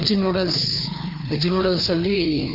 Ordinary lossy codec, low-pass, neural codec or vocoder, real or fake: none; 5.4 kHz; codec, 16 kHz, 2 kbps, FreqCodec, larger model; fake